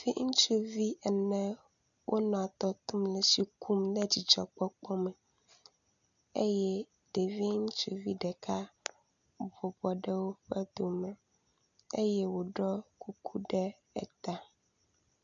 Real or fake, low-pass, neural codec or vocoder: real; 7.2 kHz; none